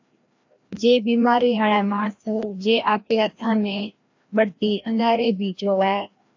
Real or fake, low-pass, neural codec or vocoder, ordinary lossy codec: fake; 7.2 kHz; codec, 16 kHz, 1 kbps, FreqCodec, larger model; AAC, 48 kbps